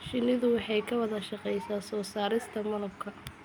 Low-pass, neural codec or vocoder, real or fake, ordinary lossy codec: none; none; real; none